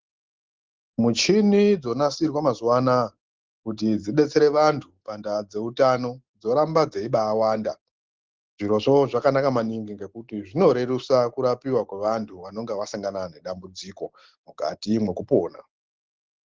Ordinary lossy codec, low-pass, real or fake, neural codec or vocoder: Opus, 16 kbps; 7.2 kHz; real; none